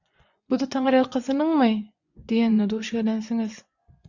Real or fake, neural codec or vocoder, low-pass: fake; vocoder, 24 kHz, 100 mel bands, Vocos; 7.2 kHz